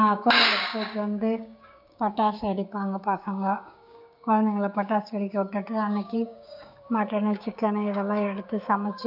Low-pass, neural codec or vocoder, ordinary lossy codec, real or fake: 5.4 kHz; codec, 16 kHz, 6 kbps, DAC; none; fake